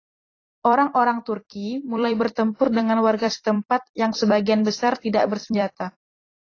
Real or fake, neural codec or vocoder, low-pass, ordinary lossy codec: fake; vocoder, 44.1 kHz, 128 mel bands every 256 samples, BigVGAN v2; 7.2 kHz; AAC, 32 kbps